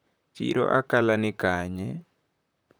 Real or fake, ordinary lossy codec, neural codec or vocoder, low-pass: fake; none; vocoder, 44.1 kHz, 128 mel bands, Pupu-Vocoder; none